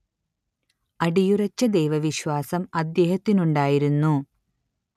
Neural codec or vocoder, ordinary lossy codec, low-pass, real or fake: none; none; 14.4 kHz; real